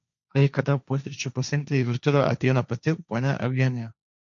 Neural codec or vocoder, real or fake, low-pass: codec, 16 kHz, 1.1 kbps, Voila-Tokenizer; fake; 7.2 kHz